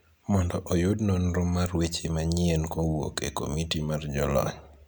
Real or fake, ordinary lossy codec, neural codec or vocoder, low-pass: real; none; none; none